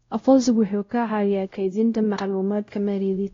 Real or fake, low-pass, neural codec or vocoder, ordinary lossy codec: fake; 7.2 kHz; codec, 16 kHz, 0.5 kbps, X-Codec, WavLM features, trained on Multilingual LibriSpeech; AAC, 32 kbps